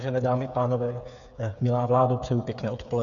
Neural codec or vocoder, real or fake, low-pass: codec, 16 kHz, 8 kbps, FreqCodec, smaller model; fake; 7.2 kHz